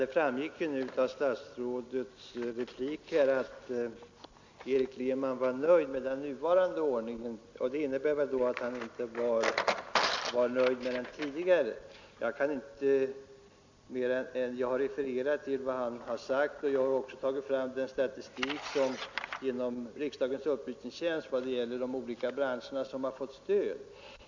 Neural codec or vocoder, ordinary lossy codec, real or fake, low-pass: none; MP3, 64 kbps; real; 7.2 kHz